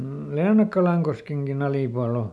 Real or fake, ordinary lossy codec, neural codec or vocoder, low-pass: real; none; none; none